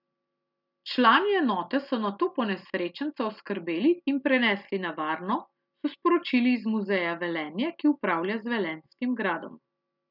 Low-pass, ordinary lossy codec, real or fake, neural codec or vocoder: 5.4 kHz; none; real; none